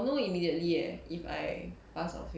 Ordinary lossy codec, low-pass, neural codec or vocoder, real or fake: none; none; none; real